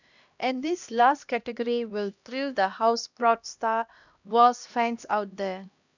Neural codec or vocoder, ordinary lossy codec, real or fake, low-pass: codec, 16 kHz, 1 kbps, X-Codec, HuBERT features, trained on LibriSpeech; none; fake; 7.2 kHz